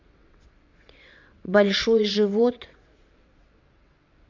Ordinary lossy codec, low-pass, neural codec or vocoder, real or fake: MP3, 64 kbps; 7.2 kHz; vocoder, 22.05 kHz, 80 mel bands, WaveNeXt; fake